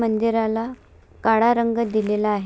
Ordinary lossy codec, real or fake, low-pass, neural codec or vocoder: none; real; none; none